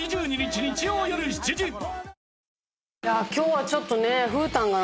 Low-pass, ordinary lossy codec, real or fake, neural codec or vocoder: none; none; real; none